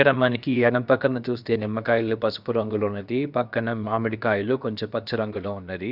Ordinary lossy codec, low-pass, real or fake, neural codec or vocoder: none; 5.4 kHz; fake; codec, 16 kHz, about 1 kbps, DyCAST, with the encoder's durations